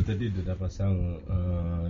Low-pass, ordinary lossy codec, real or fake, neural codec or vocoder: 7.2 kHz; MP3, 48 kbps; real; none